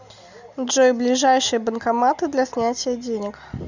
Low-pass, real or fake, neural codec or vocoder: 7.2 kHz; real; none